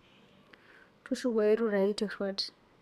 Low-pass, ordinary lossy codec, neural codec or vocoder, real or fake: 14.4 kHz; none; codec, 32 kHz, 1.9 kbps, SNAC; fake